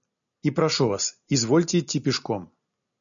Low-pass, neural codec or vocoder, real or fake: 7.2 kHz; none; real